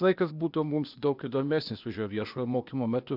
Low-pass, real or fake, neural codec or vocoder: 5.4 kHz; fake; codec, 16 kHz, 0.8 kbps, ZipCodec